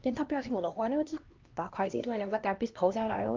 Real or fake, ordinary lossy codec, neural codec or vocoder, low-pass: fake; Opus, 24 kbps; codec, 16 kHz, 1 kbps, X-Codec, HuBERT features, trained on LibriSpeech; 7.2 kHz